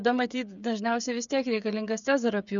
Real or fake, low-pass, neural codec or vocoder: fake; 7.2 kHz; codec, 16 kHz, 8 kbps, FreqCodec, smaller model